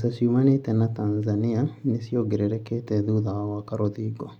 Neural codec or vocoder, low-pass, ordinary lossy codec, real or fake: none; 19.8 kHz; none; real